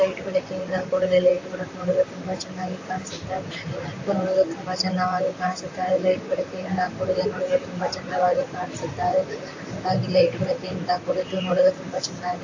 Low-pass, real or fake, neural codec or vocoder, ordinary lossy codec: 7.2 kHz; fake; vocoder, 44.1 kHz, 128 mel bands, Pupu-Vocoder; AAC, 48 kbps